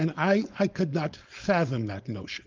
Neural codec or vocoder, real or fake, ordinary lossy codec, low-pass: codec, 16 kHz, 4.8 kbps, FACodec; fake; Opus, 32 kbps; 7.2 kHz